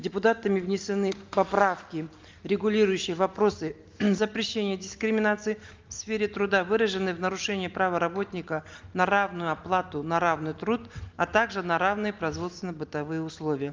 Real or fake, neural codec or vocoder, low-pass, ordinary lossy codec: real; none; 7.2 kHz; Opus, 32 kbps